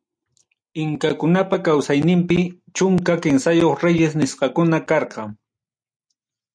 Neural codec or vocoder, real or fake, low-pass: none; real; 9.9 kHz